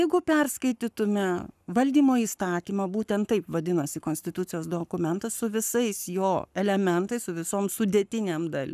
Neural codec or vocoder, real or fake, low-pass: codec, 44.1 kHz, 7.8 kbps, Pupu-Codec; fake; 14.4 kHz